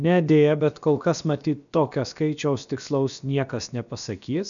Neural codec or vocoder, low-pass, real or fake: codec, 16 kHz, about 1 kbps, DyCAST, with the encoder's durations; 7.2 kHz; fake